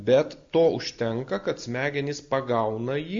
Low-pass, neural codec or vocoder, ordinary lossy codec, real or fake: 7.2 kHz; none; MP3, 48 kbps; real